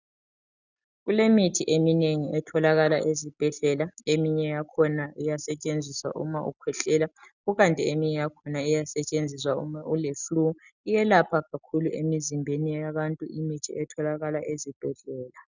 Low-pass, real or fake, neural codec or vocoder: 7.2 kHz; real; none